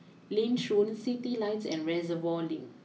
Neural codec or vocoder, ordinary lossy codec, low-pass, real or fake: none; none; none; real